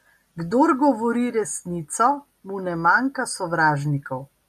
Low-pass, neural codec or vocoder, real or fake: 14.4 kHz; none; real